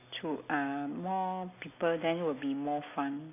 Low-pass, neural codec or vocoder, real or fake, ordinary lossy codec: 3.6 kHz; none; real; none